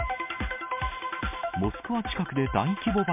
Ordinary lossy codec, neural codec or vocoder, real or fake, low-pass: MP3, 32 kbps; none; real; 3.6 kHz